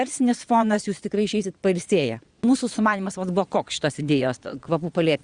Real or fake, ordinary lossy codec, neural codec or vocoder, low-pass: fake; Opus, 64 kbps; vocoder, 22.05 kHz, 80 mel bands, Vocos; 9.9 kHz